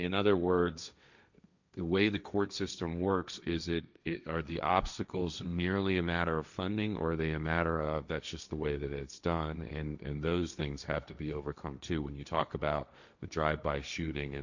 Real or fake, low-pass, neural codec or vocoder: fake; 7.2 kHz; codec, 16 kHz, 1.1 kbps, Voila-Tokenizer